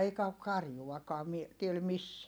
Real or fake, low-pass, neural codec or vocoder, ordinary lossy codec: real; none; none; none